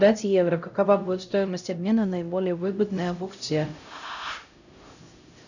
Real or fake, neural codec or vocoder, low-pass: fake; codec, 16 kHz, 0.5 kbps, X-Codec, HuBERT features, trained on LibriSpeech; 7.2 kHz